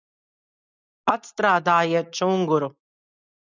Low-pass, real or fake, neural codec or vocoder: 7.2 kHz; real; none